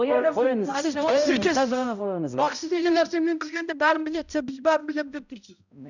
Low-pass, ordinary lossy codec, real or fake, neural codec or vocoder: 7.2 kHz; none; fake; codec, 16 kHz, 0.5 kbps, X-Codec, HuBERT features, trained on balanced general audio